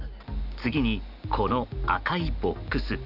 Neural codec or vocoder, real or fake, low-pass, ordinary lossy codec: none; real; 5.4 kHz; none